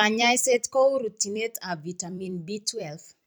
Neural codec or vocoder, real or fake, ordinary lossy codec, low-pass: vocoder, 44.1 kHz, 128 mel bands every 256 samples, BigVGAN v2; fake; none; none